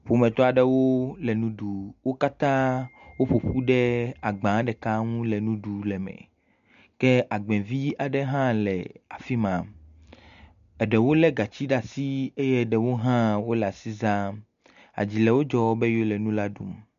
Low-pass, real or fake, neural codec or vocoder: 7.2 kHz; real; none